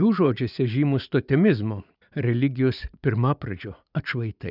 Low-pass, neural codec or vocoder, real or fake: 5.4 kHz; vocoder, 44.1 kHz, 80 mel bands, Vocos; fake